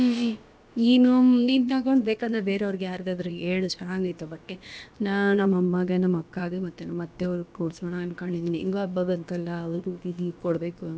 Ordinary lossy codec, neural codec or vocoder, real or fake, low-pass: none; codec, 16 kHz, about 1 kbps, DyCAST, with the encoder's durations; fake; none